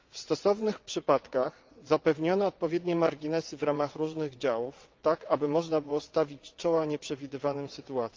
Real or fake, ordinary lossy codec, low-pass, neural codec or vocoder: fake; Opus, 32 kbps; 7.2 kHz; vocoder, 22.05 kHz, 80 mel bands, WaveNeXt